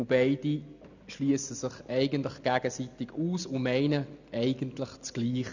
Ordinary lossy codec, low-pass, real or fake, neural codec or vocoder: none; 7.2 kHz; real; none